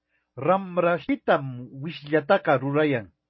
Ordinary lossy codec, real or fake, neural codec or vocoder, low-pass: MP3, 24 kbps; real; none; 7.2 kHz